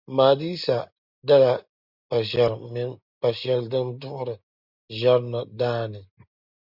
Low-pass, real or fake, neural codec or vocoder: 5.4 kHz; real; none